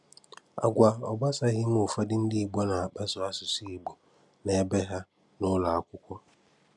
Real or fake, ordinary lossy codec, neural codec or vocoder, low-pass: real; none; none; 10.8 kHz